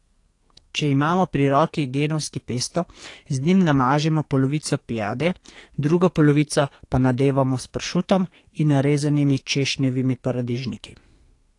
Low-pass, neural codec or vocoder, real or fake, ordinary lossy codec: 10.8 kHz; codec, 44.1 kHz, 2.6 kbps, SNAC; fake; AAC, 48 kbps